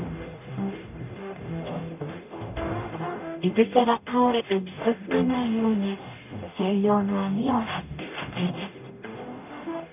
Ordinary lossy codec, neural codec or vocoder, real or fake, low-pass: none; codec, 44.1 kHz, 0.9 kbps, DAC; fake; 3.6 kHz